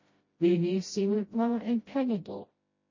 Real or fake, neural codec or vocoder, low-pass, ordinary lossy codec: fake; codec, 16 kHz, 0.5 kbps, FreqCodec, smaller model; 7.2 kHz; MP3, 32 kbps